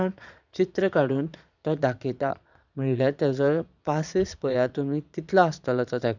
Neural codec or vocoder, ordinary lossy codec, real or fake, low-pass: vocoder, 22.05 kHz, 80 mel bands, Vocos; none; fake; 7.2 kHz